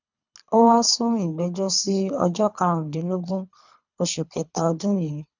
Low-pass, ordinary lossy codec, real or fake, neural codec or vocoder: 7.2 kHz; none; fake; codec, 24 kHz, 3 kbps, HILCodec